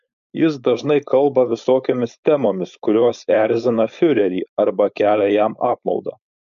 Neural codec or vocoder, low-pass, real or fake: codec, 16 kHz, 4.8 kbps, FACodec; 7.2 kHz; fake